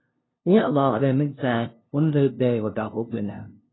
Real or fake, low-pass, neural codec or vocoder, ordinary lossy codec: fake; 7.2 kHz; codec, 16 kHz, 0.5 kbps, FunCodec, trained on LibriTTS, 25 frames a second; AAC, 16 kbps